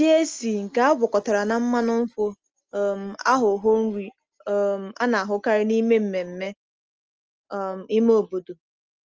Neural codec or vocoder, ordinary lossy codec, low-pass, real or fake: none; Opus, 24 kbps; 7.2 kHz; real